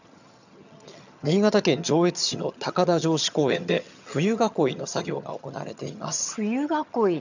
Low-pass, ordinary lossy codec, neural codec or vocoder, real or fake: 7.2 kHz; none; vocoder, 22.05 kHz, 80 mel bands, HiFi-GAN; fake